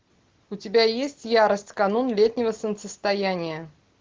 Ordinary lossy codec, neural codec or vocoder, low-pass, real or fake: Opus, 16 kbps; none; 7.2 kHz; real